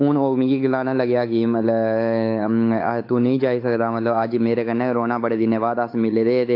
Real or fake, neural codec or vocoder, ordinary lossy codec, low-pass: fake; codec, 16 kHz, 8 kbps, FunCodec, trained on Chinese and English, 25 frames a second; AAC, 32 kbps; 5.4 kHz